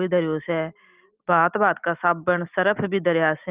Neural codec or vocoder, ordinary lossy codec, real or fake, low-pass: none; Opus, 32 kbps; real; 3.6 kHz